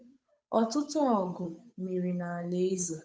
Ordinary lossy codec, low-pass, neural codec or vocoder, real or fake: none; none; codec, 16 kHz, 8 kbps, FunCodec, trained on Chinese and English, 25 frames a second; fake